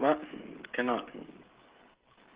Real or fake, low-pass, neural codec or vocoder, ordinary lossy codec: fake; 3.6 kHz; codec, 16 kHz, 16 kbps, FreqCodec, smaller model; Opus, 16 kbps